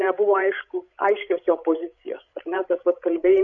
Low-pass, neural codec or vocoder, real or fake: 7.2 kHz; codec, 16 kHz, 16 kbps, FreqCodec, larger model; fake